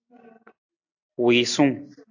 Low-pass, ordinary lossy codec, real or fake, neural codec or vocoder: 7.2 kHz; AAC, 48 kbps; real; none